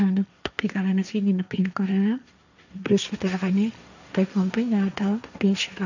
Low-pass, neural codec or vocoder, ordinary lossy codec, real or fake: 7.2 kHz; codec, 16 kHz, 1.1 kbps, Voila-Tokenizer; none; fake